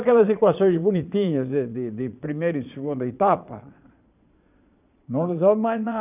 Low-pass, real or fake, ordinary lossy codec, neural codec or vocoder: 3.6 kHz; fake; none; vocoder, 22.05 kHz, 80 mel bands, Vocos